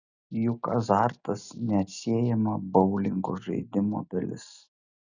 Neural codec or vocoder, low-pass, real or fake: none; 7.2 kHz; real